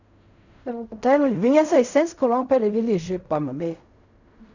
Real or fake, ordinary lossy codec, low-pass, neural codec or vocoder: fake; none; 7.2 kHz; codec, 16 kHz in and 24 kHz out, 0.4 kbps, LongCat-Audio-Codec, fine tuned four codebook decoder